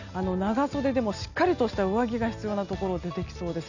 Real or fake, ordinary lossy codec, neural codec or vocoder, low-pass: real; none; none; 7.2 kHz